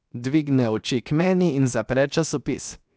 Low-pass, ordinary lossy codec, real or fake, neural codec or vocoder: none; none; fake; codec, 16 kHz, 0.7 kbps, FocalCodec